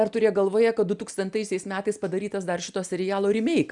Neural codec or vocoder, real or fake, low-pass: none; real; 10.8 kHz